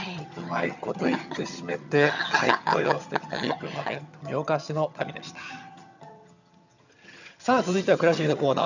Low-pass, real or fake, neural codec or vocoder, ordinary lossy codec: 7.2 kHz; fake; vocoder, 22.05 kHz, 80 mel bands, HiFi-GAN; none